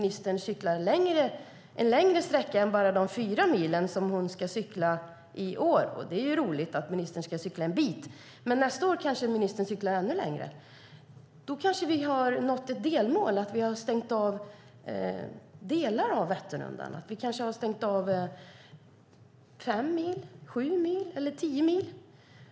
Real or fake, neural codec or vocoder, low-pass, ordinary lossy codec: real; none; none; none